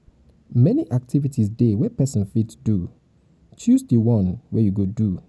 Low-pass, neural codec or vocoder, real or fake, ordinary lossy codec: none; none; real; none